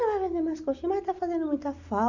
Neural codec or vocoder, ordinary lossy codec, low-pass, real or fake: none; Opus, 64 kbps; 7.2 kHz; real